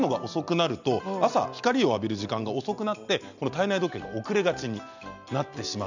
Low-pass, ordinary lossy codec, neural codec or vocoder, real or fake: 7.2 kHz; none; none; real